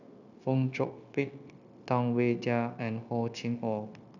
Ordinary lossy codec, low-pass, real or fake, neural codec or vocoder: none; 7.2 kHz; fake; codec, 16 kHz, 0.9 kbps, LongCat-Audio-Codec